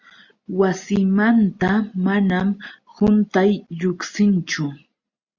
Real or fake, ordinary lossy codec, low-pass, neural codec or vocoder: real; Opus, 64 kbps; 7.2 kHz; none